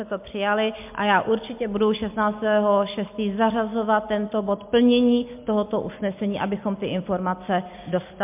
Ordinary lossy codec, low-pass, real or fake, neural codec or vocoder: MP3, 32 kbps; 3.6 kHz; real; none